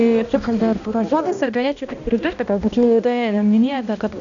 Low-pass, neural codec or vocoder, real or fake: 7.2 kHz; codec, 16 kHz, 1 kbps, X-Codec, HuBERT features, trained on balanced general audio; fake